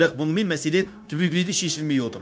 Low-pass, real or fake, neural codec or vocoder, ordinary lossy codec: none; fake; codec, 16 kHz, 0.9 kbps, LongCat-Audio-Codec; none